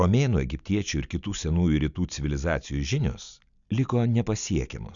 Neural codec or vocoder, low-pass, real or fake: none; 7.2 kHz; real